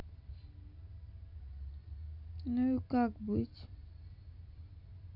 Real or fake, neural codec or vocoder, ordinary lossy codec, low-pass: real; none; none; 5.4 kHz